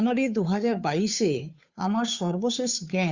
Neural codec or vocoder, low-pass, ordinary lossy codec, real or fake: codec, 16 kHz, 2 kbps, FunCodec, trained on Chinese and English, 25 frames a second; 7.2 kHz; Opus, 64 kbps; fake